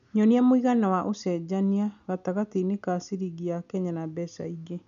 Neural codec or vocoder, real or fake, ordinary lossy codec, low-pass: none; real; none; 7.2 kHz